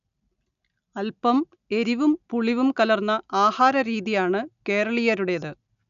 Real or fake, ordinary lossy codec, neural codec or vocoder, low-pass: real; none; none; 7.2 kHz